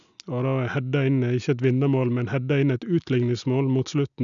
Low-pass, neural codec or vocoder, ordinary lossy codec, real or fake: 7.2 kHz; none; none; real